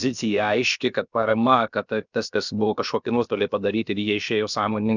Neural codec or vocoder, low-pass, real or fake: codec, 16 kHz, about 1 kbps, DyCAST, with the encoder's durations; 7.2 kHz; fake